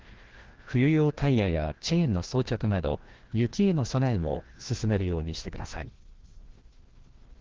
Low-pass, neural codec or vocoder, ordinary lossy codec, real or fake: 7.2 kHz; codec, 16 kHz, 1 kbps, FreqCodec, larger model; Opus, 16 kbps; fake